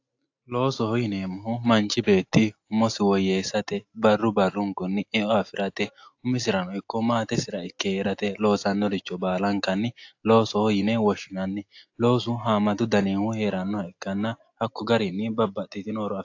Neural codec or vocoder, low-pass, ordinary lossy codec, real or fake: none; 7.2 kHz; AAC, 48 kbps; real